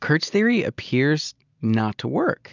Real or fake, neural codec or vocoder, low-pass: real; none; 7.2 kHz